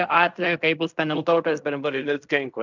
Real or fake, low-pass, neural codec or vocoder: fake; 7.2 kHz; codec, 16 kHz in and 24 kHz out, 0.4 kbps, LongCat-Audio-Codec, fine tuned four codebook decoder